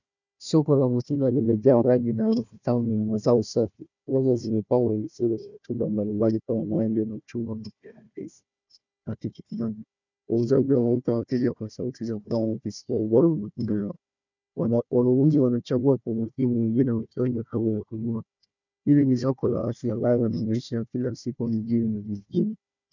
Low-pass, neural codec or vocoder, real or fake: 7.2 kHz; codec, 16 kHz, 1 kbps, FunCodec, trained on Chinese and English, 50 frames a second; fake